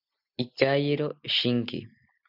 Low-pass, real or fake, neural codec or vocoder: 5.4 kHz; real; none